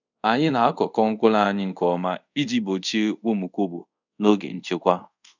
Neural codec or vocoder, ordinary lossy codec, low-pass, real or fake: codec, 24 kHz, 0.5 kbps, DualCodec; none; 7.2 kHz; fake